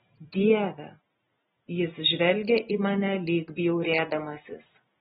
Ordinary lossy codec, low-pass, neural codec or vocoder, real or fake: AAC, 16 kbps; 19.8 kHz; none; real